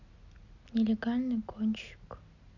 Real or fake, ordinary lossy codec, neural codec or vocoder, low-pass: real; none; none; 7.2 kHz